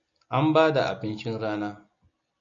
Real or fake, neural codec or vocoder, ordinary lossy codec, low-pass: real; none; MP3, 96 kbps; 7.2 kHz